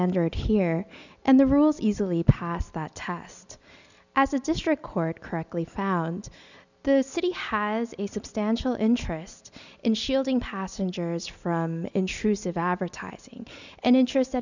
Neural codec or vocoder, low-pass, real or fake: none; 7.2 kHz; real